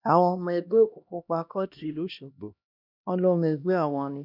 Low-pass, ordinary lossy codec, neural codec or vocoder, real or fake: 5.4 kHz; none; codec, 16 kHz, 1 kbps, X-Codec, HuBERT features, trained on LibriSpeech; fake